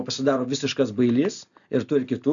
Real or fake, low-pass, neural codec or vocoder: real; 7.2 kHz; none